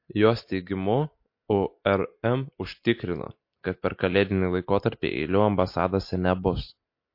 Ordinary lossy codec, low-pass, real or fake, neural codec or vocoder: MP3, 32 kbps; 5.4 kHz; real; none